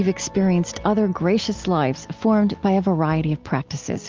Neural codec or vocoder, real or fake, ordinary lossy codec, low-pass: none; real; Opus, 24 kbps; 7.2 kHz